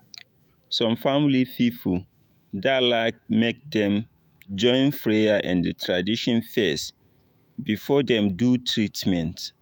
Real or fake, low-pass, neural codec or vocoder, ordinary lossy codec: fake; 19.8 kHz; autoencoder, 48 kHz, 128 numbers a frame, DAC-VAE, trained on Japanese speech; none